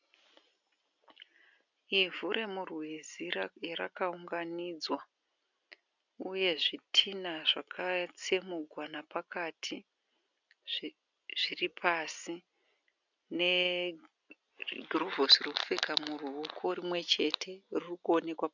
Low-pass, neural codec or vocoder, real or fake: 7.2 kHz; none; real